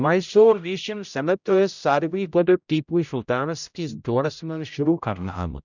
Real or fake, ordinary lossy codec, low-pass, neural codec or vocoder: fake; none; 7.2 kHz; codec, 16 kHz, 0.5 kbps, X-Codec, HuBERT features, trained on general audio